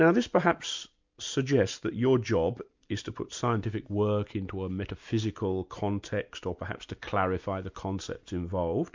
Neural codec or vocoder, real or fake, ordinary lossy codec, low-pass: none; real; MP3, 64 kbps; 7.2 kHz